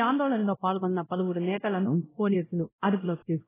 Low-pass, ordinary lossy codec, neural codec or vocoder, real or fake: 3.6 kHz; AAC, 16 kbps; codec, 16 kHz, 0.5 kbps, X-Codec, WavLM features, trained on Multilingual LibriSpeech; fake